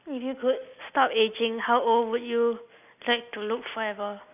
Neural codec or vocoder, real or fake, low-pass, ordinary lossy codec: none; real; 3.6 kHz; none